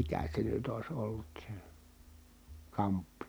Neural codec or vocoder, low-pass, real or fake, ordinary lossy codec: none; none; real; none